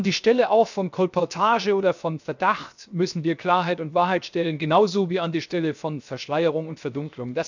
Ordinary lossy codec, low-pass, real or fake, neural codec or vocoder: none; 7.2 kHz; fake; codec, 16 kHz, 0.7 kbps, FocalCodec